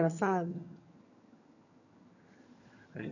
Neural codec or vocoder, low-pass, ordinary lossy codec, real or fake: vocoder, 22.05 kHz, 80 mel bands, HiFi-GAN; 7.2 kHz; none; fake